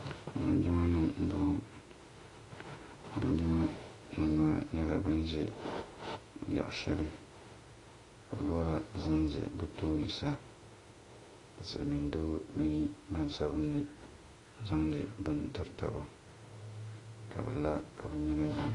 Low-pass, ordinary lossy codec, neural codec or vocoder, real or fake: 10.8 kHz; AAC, 32 kbps; autoencoder, 48 kHz, 32 numbers a frame, DAC-VAE, trained on Japanese speech; fake